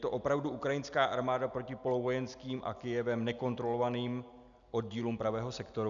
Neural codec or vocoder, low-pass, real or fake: none; 7.2 kHz; real